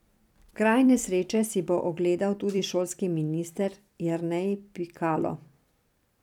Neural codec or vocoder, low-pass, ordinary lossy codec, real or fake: vocoder, 44.1 kHz, 128 mel bands every 256 samples, BigVGAN v2; 19.8 kHz; none; fake